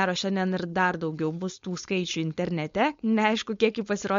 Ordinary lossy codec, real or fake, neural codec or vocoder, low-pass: MP3, 48 kbps; fake; codec, 16 kHz, 4.8 kbps, FACodec; 7.2 kHz